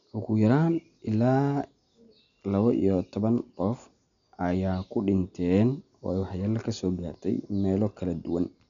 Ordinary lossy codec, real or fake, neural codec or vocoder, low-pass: none; real; none; 7.2 kHz